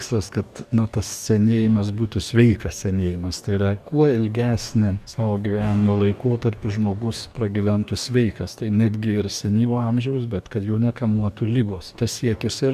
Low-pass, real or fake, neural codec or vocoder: 14.4 kHz; fake; codec, 44.1 kHz, 2.6 kbps, DAC